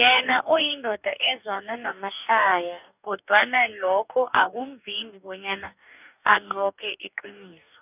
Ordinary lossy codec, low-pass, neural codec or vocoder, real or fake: none; 3.6 kHz; codec, 44.1 kHz, 2.6 kbps, DAC; fake